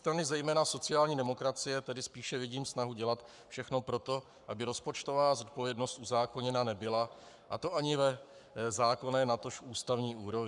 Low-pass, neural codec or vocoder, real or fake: 10.8 kHz; codec, 44.1 kHz, 7.8 kbps, Pupu-Codec; fake